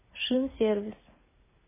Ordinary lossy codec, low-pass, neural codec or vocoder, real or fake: MP3, 16 kbps; 3.6 kHz; none; real